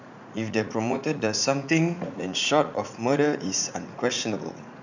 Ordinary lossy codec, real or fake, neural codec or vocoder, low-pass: none; fake; vocoder, 44.1 kHz, 80 mel bands, Vocos; 7.2 kHz